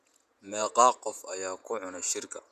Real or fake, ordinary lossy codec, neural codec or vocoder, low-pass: fake; none; vocoder, 48 kHz, 128 mel bands, Vocos; 14.4 kHz